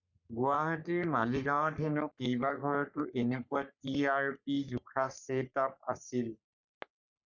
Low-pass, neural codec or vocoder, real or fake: 7.2 kHz; codec, 44.1 kHz, 3.4 kbps, Pupu-Codec; fake